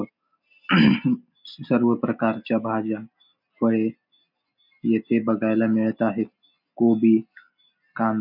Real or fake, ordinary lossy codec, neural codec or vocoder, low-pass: real; none; none; 5.4 kHz